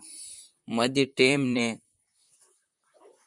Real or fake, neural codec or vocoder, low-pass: fake; vocoder, 44.1 kHz, 128 mel bands, Pupu-Vocoder; 10.8 kHz